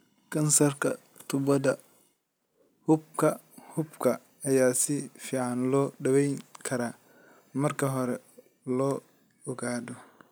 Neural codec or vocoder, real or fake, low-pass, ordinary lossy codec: none; real; none; none